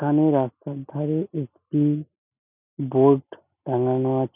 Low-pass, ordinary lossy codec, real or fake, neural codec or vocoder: 3.6 kHz; none; real; none